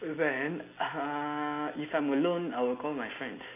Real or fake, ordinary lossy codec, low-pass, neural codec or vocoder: real; MP3, 32 kbps; 3.6 kHz; none